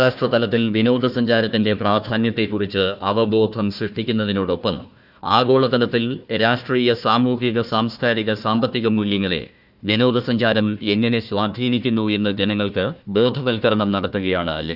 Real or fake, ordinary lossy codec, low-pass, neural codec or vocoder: fake; none; 5.4 kHz; codec, 16 kHz, 1 kbps, FunCodec, trained on Chinese and English, 50 frames a second